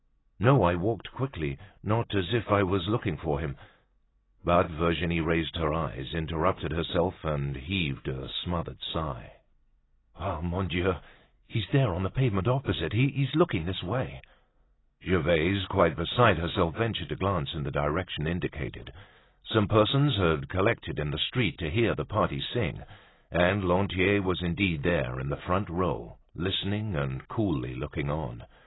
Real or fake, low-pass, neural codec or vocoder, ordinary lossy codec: real; 7.2 kHz; none; AAC, 16 kbps